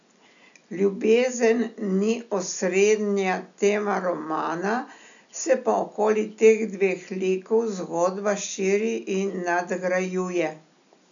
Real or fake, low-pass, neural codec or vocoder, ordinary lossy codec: real; 7.2 kHz; none; none